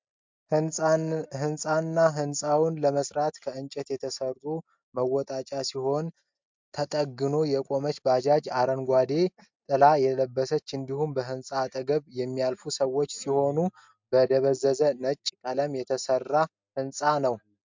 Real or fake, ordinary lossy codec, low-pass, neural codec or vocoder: real; MP3, 64 kbps; 7.2 kHz; none